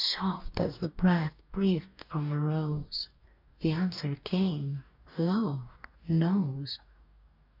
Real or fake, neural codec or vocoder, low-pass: fake; codec, 44.1 kHz, 2.6 kbps, DAC; 5.4 kHz